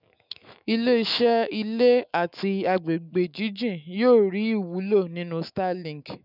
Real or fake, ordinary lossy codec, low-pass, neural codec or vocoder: fake; none; 5.4 kHz; autoencoder, 48 kHz, 128 numbers a frame, DAC-VAE, trained on Japanese speech